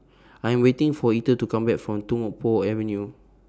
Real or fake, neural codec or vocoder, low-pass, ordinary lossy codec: real; none; none; none